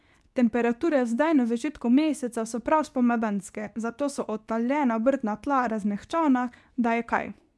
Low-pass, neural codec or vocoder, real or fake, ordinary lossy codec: none; codec, 24 kHz, 0.9 kbps, WavTokenizer, medium speech release version 2; fake; none